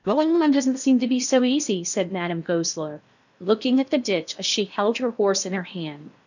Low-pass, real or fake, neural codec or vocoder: 7.2 kHz; fake; codec, 16 kHz in and 24 kHz out, 0.8 kbps, FocalCodec, streaming, 65536 codes